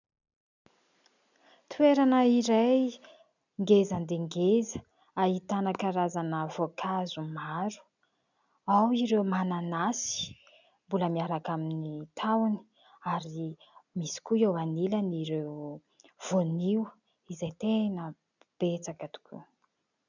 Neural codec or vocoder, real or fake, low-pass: none; real; 7.2 kHz